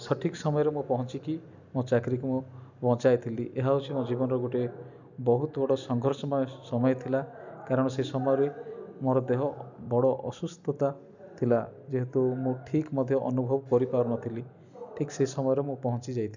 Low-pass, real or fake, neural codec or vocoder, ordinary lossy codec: 7.2 kHz; real; none; none